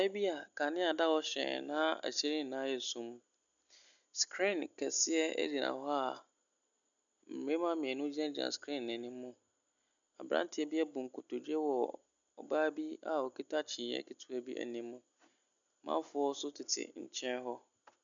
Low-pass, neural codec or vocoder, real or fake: 7.2 kHz; none; real